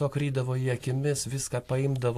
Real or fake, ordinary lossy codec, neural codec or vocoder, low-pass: real; AAC, 64 kbps; none; 14.4 kHz